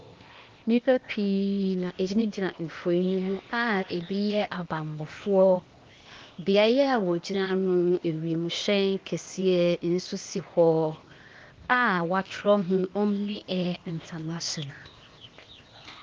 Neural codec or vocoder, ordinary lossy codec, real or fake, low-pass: codec, 16 kHz, 0.8 kbps, ZipCodec; Opus, 32 kbps; fake; 7.2 kHz